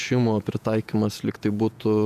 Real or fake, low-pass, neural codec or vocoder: fake; 14.4 kHz; vocoder, 48 kHz, 128 mel bands, Vocos